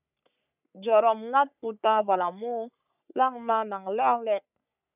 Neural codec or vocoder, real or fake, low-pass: codec, 44.1 kHz, 3.4 kbps, Pupu-Codec; fake; 3.6 kHz